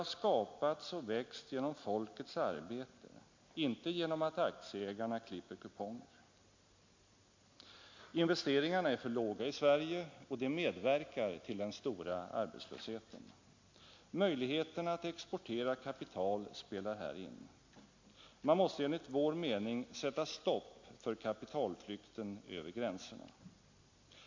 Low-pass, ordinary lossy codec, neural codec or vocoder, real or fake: 7.2 kHz; MP3, 48 kbps; none; real